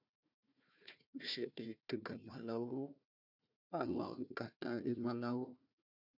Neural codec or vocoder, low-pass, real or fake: codec, 16 kHz, 1 kbps, FunCodec, trained on Chinese and English, 50 frames a second; 5.4 kHz; fake